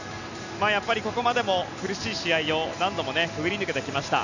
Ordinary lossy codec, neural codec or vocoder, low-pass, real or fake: none; none; 7.2 kHz; real